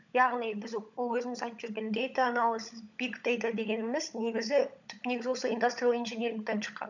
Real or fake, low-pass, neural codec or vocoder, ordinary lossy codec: fake; 7.2 kHz; codec, 16 kHz, 16 kbps, FunCodec, trained on LibriTTS, 50 frames a second; none